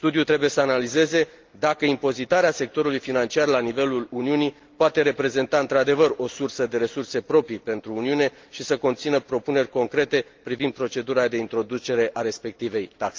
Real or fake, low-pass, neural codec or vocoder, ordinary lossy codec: real; 7.2 kHz; none; Opus, 32 kbps